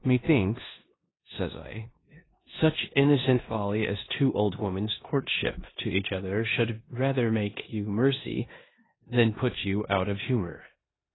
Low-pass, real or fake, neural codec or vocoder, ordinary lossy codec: 7.2 kHz; fake; codec, 16 kHz in and 24 kHz out, 0.9 kbps, LongCat-Audio-Codec, four codebook decoder; AAC, 16 kbps